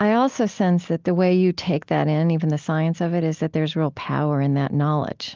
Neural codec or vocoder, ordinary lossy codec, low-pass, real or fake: none; Opus, 32 kbps; 7.2 kHz; real